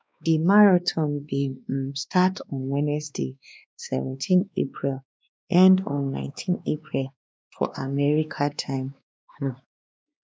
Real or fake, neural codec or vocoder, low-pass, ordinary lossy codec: fake; codec, 16 kHz, 2 kbps, X-Codec, WavLM features, trained on Multilingual LibriSpeech; none; none